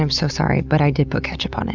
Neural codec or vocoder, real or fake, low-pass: none; real; 7.2 kHz